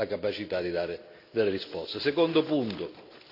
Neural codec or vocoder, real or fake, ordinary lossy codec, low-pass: none; real; none; 5.4 kHz